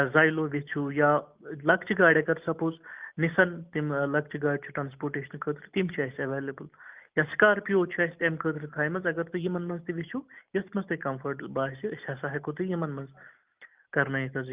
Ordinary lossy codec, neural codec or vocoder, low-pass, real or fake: Opus, 24 kbps; none; 3.6 kHz; real